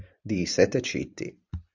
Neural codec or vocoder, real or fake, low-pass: vocoder, 44.1 kHz, 128 mel bands every 512 samples, BigVGAN v2; fake; 7.2 kHz